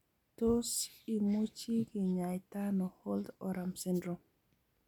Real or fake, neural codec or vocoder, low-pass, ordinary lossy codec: real; none; 19.8 kHz; none